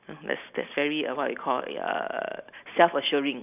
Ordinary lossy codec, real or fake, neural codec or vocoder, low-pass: none; real; none; 3.6 kHz